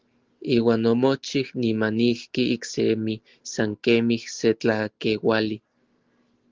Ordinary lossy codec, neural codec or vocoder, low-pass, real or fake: Opus, 16 kbps; none; 7.2 kHz; real